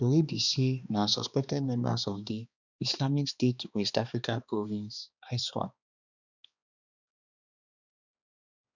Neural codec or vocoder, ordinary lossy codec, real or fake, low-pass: codec, 16 kHz, 2 kbps, X-Codec, HuBERT features, trained on balanced general audio; none; fake; 7.2 kHz